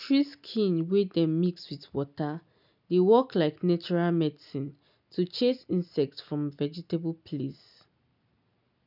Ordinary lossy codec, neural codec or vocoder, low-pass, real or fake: none; none; 5.4 kHz; real